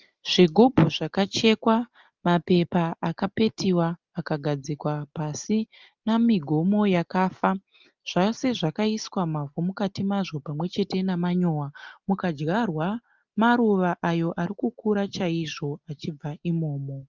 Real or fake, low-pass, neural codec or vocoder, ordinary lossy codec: real; 7.2 kHz; none; Opus, 32 kbps